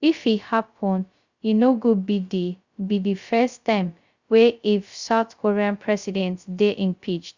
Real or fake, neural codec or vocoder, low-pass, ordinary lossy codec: fake; codec, 16 kHz, 0.2 kbps, FocalCodec; 7.2 kHz; Opus, 64 kbps